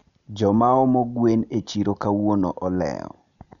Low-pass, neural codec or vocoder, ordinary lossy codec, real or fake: 7.2 kHz; none; none; real